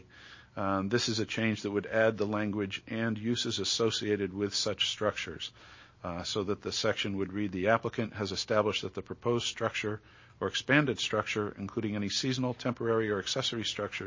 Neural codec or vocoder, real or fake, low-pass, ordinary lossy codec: none; real; 7.2 kHz; MP3, 32 kbps